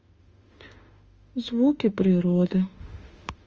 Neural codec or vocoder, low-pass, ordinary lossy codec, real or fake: autoencoder, 48 kHz, 32 numbers a frame, DAC-VAE, trained on Japanese speech; 7.2 kHz; Opus, 24 kbps; fake